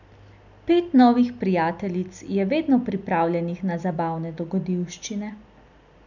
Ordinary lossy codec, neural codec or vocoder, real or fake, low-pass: none; none; real; 7.2 kHz